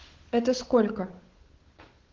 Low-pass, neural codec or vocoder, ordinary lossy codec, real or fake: 7.2 kHz; codec, 16 kHz, 8 kbps, FunCodec, trained on Chinese and English, 25 frames a second; Opus, 16 kbps; fake